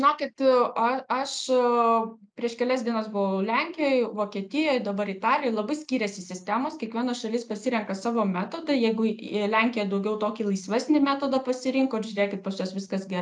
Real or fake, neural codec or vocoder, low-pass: real; none; 9.9 kHz